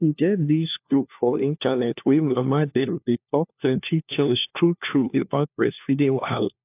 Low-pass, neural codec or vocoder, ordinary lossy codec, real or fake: 3.6 kHz; codec, 16 kHz, 1 kbps, FunCodec, trained on LibriTTS, 50 frames a second; AAC, 32 kbps; fake